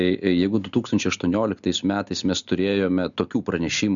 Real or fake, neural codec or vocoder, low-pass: real; none; 7.2 kHz